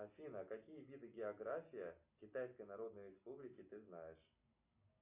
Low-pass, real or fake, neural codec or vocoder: 3.6 kHz; real; none